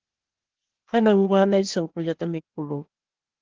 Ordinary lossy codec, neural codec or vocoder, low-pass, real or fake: Opus, 16 kbps; codec, 16 kHz, 0.8 kbps, ZipCodec; 7.2 kHz; fake